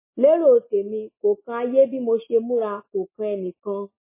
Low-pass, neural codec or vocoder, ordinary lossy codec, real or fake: 3.6 kHz; none; MP3, 16 kbps; real